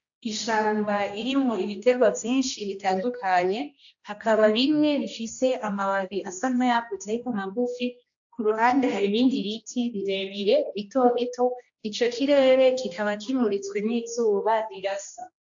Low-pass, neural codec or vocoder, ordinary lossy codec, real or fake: 7.2 kHz; codec, 16 kHz, 1 kbps, X-Codec, HuBERT features, trained on general audio; AAC, 64 kbps; fake